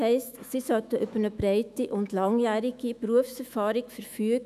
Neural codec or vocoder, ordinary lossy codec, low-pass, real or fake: autoencoder, 48 kHz, 128 numbers a frame, DAC-VAE, trained on Japanese speech; none; 14.4 kHz; fake